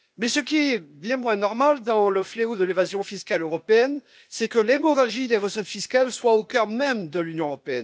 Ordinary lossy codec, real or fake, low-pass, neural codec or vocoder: none; fake; none; codec, 16 kHz, 0.8 kbps, ZipCodec